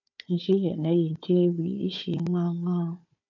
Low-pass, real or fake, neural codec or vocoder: 7.2 kHz; fake; codec, 16 kHz, 4 kbps, FunCodec, trained on Chinese and English, 50 frames a second